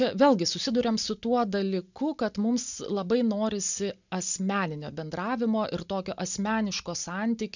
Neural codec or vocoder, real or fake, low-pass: none; real; 7.2 kHz